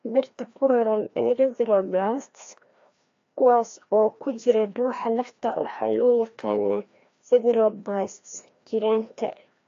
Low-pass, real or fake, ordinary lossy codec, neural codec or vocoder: 7.2 kHz; fake; AAC, 48 kbps; codec, 16 kHz, 1 kbps, FreqCodec, larger model